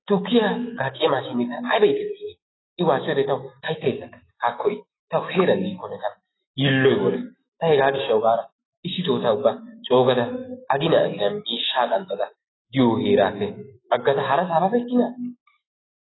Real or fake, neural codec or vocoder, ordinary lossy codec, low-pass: fake; autoencoder, 48 kHz, 128 numbers a frame, DAC-VAE, trained on Japanese speech; AAC, 16 kbps; 7.2 kHz